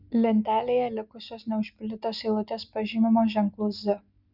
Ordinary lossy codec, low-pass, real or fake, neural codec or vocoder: Opus, 64 kbps; 5.4 kHz; real; none